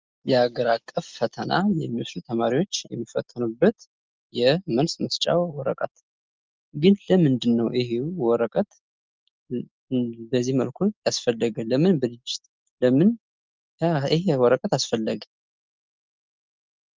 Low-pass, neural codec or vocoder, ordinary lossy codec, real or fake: 7.2 kHz; none; Opus, 24 kbps; real